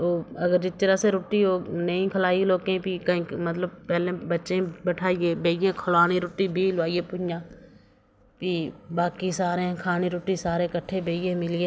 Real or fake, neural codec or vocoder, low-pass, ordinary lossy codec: real; none; none; none